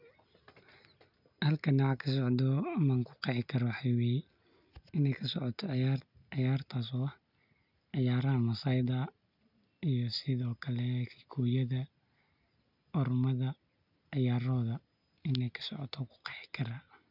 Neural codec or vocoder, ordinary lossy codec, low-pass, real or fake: none; none; 5.4 kHz; real